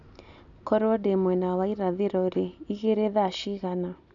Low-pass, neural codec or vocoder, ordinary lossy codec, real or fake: 7.2 kHz; none; none; real